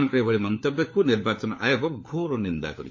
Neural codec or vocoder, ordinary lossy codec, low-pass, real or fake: codec, 16 kHz, 4 kbps, FunCodec, trained on LibriTTS, 50 frames a second; MP3, 32 kbps; 7.2 kHz; fake